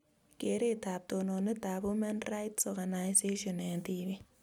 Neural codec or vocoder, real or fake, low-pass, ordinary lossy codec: none; real; none; none